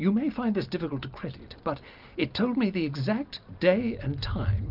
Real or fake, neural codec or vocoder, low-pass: real; none; 5.4 kHz